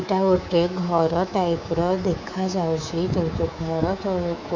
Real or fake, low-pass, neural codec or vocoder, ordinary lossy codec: fake; 7.2 kHz; codec, 24 kHz, 3.1 kbps, DualCodec; MP3, 64 kbps